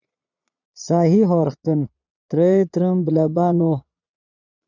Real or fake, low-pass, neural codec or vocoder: fake; 7.2 kHz; vocoder, 22.05 kHz, 80 mel bands, Vocos